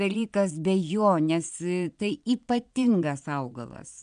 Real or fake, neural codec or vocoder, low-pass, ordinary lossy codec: fake; vocoder, 22.05 kHz, 80 mel bands, Vocos; 9.9 kHz; MP3, 96 kbps